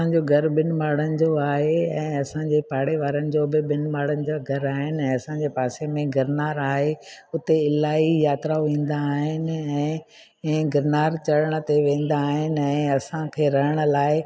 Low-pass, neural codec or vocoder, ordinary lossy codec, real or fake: none; none; none; real